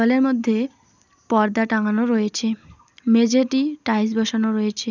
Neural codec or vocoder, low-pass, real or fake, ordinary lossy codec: none; 7.2 kHz; real; none